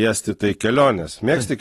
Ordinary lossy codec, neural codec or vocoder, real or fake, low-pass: AAC, 32 kbps; none; real; 19.8 kHz